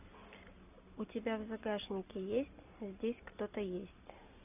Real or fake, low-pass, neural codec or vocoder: real; 3.6 kHz; none